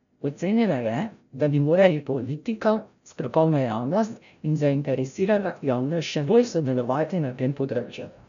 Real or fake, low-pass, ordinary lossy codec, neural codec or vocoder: fake; 7.2 kHz; none; codec, 16 kHz, 0.5 kbps, FreqCodec, larger model